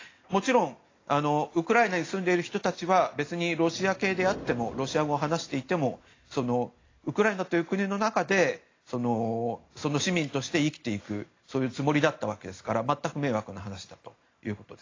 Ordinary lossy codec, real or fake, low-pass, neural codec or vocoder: AAC, 32 kbps; real; 7.2 kHz; none